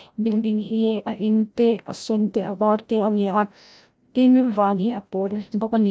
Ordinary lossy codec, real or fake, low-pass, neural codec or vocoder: none; fake; none; codec, 16 kHz, 0.5 kbps, FreqCodec, larger model